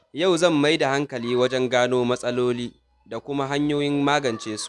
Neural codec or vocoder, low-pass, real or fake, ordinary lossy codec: none; none; real; none